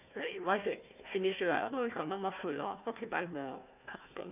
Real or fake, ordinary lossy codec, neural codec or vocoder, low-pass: fake; none; codec, 16 kHz, 1 kbps, FunCodec, trained on Chinese and English, 50 frames a second; 3.6 kHz